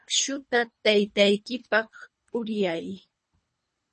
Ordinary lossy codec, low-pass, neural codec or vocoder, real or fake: MP3, 32 kbps; 10.8 kHz; codec, 24 kHz, 3 kbps, HILCodec; fake